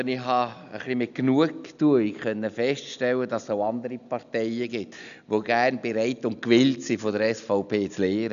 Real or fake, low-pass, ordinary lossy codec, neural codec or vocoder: real; 7.2 kHz; none; none